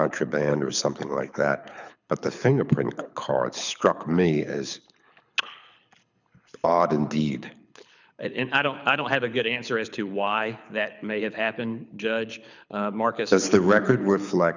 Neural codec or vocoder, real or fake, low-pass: codec, 24 kHz, 6 kbps, HILCodec; fake; 7.2 kHz